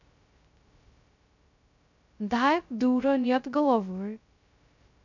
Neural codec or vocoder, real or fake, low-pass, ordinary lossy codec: codec, 16 kHz, 0.2 kbps, FocalCodec; fake; 7.2 kHz; none